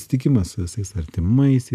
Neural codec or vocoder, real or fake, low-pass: none; real; 14.4 kHz